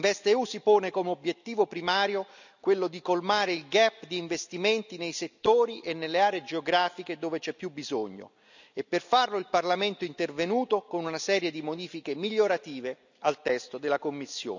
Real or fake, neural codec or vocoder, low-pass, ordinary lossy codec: real; none; 7.2 kHz; none